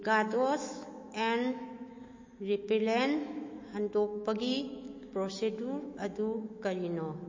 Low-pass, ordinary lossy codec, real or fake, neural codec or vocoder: 7.2 kHz; MP3, 32 kbps; fake; autoencoder, 48 kHz, 128 numbers a frame, DAC-VAE, trained on Japanese speech